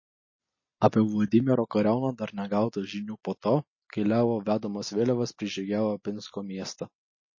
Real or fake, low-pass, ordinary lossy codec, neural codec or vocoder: real; 7.2 kHz; MP3, 32 kbps; none